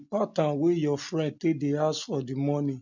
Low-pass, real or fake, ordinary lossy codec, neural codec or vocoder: 7.2 kHz; real; none; none